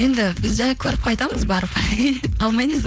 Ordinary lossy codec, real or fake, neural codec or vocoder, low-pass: none; fake; codec, 16 kHz, 4.8 kbps, FACodec; none